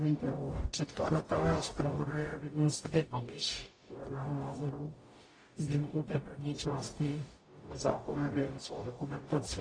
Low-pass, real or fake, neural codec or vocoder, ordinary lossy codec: 9.9 kHz; fake; codec, 44.1 kHz, 0.9 kbps, DAC; AAC, 32 kbps